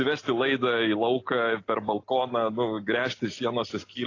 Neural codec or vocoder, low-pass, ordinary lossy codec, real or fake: none; 7.2 kHz; AAC, 32 kbps; real